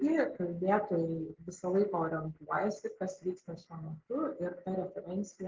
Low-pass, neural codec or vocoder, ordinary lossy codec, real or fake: 7.2 kHz; none; Opus, 16 kbps; real